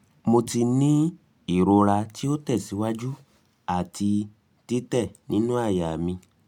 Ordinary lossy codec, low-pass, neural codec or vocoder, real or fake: MP3, 96 kbps; 19.8 kHz; none; real